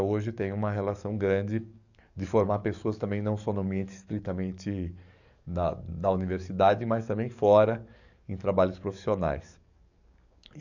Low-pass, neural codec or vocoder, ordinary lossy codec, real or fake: 7.2 kHz; codec, 44.1 kHz, 7.8 kbps, DAC; none; fake